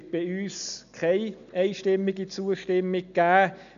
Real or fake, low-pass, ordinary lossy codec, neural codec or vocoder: real; 7.2 kHz; none; none